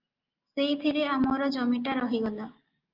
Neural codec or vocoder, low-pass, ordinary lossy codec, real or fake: none; 5.4 kHz; Opus, 24 kbps; real